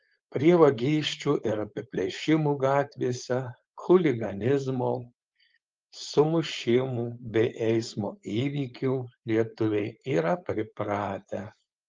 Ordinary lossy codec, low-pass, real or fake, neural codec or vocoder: Opus, 32 kbps; 7.2 kHz; fake; codec, 16 kHz, 4.8 kbps, FACodec